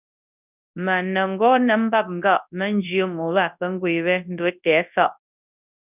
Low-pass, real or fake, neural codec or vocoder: 3.6 kHz; fake; codec, 24 kHz, 0.9 kbps, WavTokenizer, large speech release